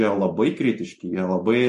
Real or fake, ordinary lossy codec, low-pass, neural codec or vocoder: real; MP3, 48 kbps; 14.4 kHz; none